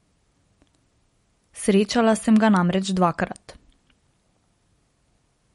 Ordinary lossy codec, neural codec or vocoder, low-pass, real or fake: MP3, 48 kbps; none; 19.8 kHz; real